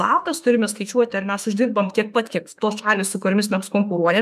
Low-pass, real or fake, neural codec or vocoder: 14.4 kHz; fake; autoencoder, 48 kHz, 32 numbers a frame, DAC-VAE, trained on Japanese speech